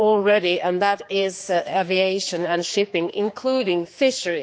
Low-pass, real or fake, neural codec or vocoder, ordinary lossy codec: none; fake; codec, 16 kHz, 2 kbps, X-Codec, HuBERT features, trained on general audio; none